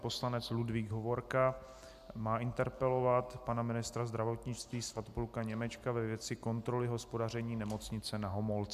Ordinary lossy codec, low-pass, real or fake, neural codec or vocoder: MP3, 96 kbps; 14.4 kHz; real; none